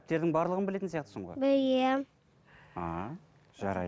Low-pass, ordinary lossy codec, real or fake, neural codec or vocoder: none; none; real; none